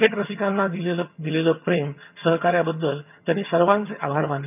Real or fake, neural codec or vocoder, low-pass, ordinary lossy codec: fake; vocoder, 22.05 kHz, 80 mel bands, HiFi-GAN; 3.6 kHz; none